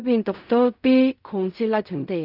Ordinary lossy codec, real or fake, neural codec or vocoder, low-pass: none; fake; codec, 16 kHz in and 24 kHz out, 0.4 kbps, LongCat-Audio-Codec, fine tuned four codebook decoder; 5.4 kHz